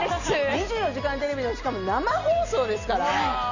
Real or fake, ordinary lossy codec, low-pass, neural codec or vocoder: real; none; 7.2 kHz; none